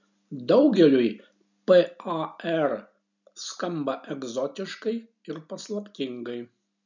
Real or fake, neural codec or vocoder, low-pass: real; none; 7.2 kHz